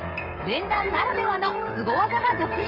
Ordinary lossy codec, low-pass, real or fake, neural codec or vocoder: none; 5.4 kHz; fake; codec, 16 kHz, 16 kbps, FreqCodec, smaller model